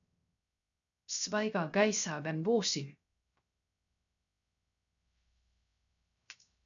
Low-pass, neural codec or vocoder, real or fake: 7.2 kHz; codec, 16 kHz, 0.3 kbps, FocalCodec; fake